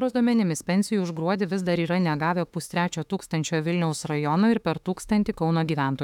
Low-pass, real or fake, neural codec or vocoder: 19.8 kHz; fake; autoencoder, 48 kHz, 32 numbers a frame, DAC-VAE, trained on Japanese speech